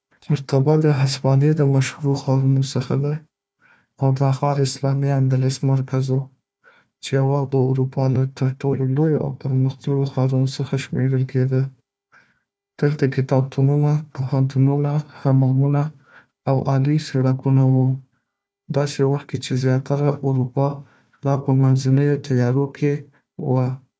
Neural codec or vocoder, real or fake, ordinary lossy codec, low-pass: codec, 16 kHz, 1 kbps, FunCodec, trained on Chinese and English, 50 frames a second; fake; none; none